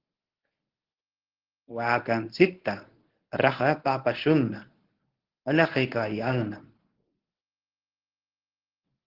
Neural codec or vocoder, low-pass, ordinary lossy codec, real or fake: codec, 24 kHz, 0.9 kbps, WavTokenizer, medium speech release version 1; 5.4 kHz; Opus, 16 kbps; fake